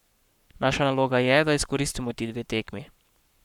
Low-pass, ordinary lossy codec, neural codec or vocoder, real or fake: 19.8 kHz; none; codec, 44.1 kHz, 7.8 kbps, Pupu-Codec; fake